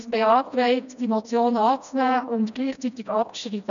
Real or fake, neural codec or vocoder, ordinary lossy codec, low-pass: fake; codec, 16 kHz, 1 kbps, FreqCodec, smaller model; none; 7.2 kHz